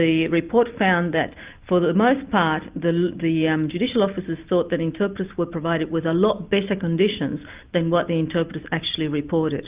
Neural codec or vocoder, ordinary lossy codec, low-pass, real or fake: none; Opus, 24 kbps; 3.6 kHz; real